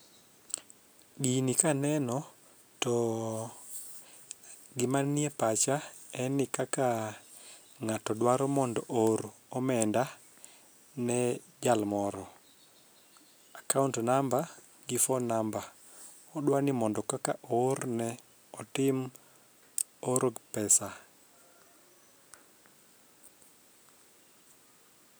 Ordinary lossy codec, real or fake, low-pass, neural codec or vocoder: none; real; none; none